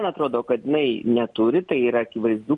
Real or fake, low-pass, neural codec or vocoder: real; 10.8 kHz; none